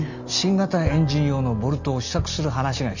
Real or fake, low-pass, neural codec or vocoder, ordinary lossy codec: real; 7.2 kHz; none; none